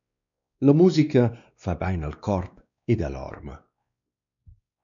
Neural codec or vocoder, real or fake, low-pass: codec, 16 kHz, 2 kbps, X-Codec, WavLM features, trained on Multilingual LibriSpeech; fake; 7.2 kHz